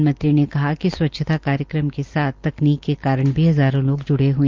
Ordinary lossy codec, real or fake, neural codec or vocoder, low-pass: Opus, 32 kbps; real; none; 7.2 kHz